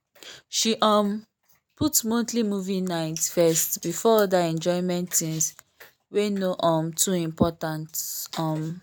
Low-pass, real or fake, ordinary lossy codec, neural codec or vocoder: none; real; none; none